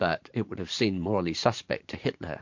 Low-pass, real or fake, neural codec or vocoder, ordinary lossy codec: 7.2 kHz; fake; vocoder, 44.1 kHz, 80 mel bands, Vocos; MP3, 48 kbps